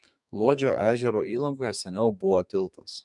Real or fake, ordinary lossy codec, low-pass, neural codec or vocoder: fake; MP3, 96 kbps; 10.8 kHz; codec, 32 kHz, 1.9 kbps, SNAC